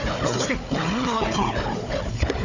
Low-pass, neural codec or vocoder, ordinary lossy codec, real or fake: 7.2 kHz; codec, 16 kHz, 4 kbps, FunCodec, trained on Chinese and English, 50 frames a second; Opus, 64 kbps; fake